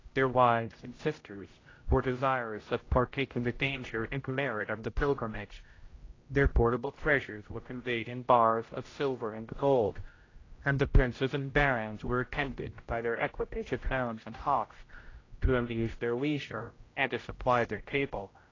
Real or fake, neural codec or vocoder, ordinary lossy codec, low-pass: fake; codec, 16 kHz, 0.5 kbps, X-Codec, HuBERT features, trained on general audio; AAC, 32 kbps; 7.2 kHz